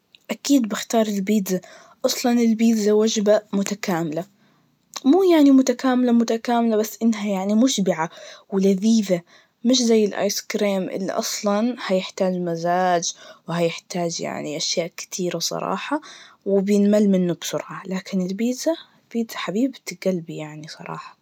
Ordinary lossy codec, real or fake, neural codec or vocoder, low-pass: none; real; none; 19.8 kHz